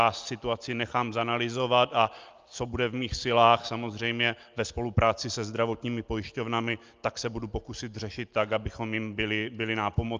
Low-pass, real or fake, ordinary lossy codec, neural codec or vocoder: 7.2 kHz; real; Opus, 24 kbps; none